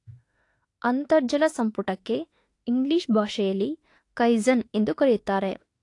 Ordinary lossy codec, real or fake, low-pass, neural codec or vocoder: AAC, 48 kbps; fake; 10.8 kHz; autoencoder, 48 kHz, 32 numbers a frame, DAC-VAE, trained on Japanese speech